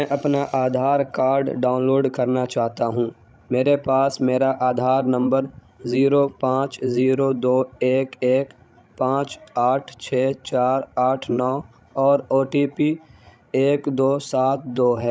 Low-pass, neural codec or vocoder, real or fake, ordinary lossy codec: none; codec, 16 kHz, 16 kbps, FreqCodec, larger model; fake; none